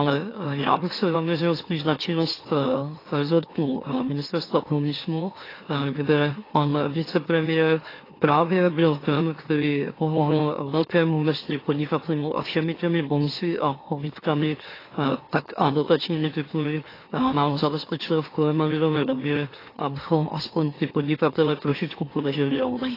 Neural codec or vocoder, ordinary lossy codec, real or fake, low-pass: autoencoder, 44.1 kHz, a latent of 192 numbers a frame, MeloTTS; AAC, 24 kbps; fake; 5.4 kHz